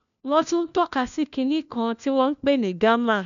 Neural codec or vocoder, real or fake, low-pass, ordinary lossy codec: codec, 16 kHz, 1 kbps, FunCodec, trained on LibriTTS, 50 frames a second; fake; 7.2 kHz; none